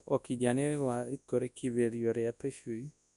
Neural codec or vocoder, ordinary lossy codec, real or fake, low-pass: codec, 24 kHz, 0.9 kbps, WavTokenizer, large speech release; none; fake; 10.8 kHz